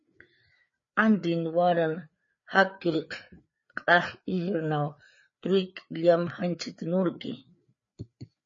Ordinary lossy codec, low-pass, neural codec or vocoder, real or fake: MP3, 32 kbps; 7.2 kHz; codec, 16 kHz, 4 kbps, FreqCodec, larger model; fake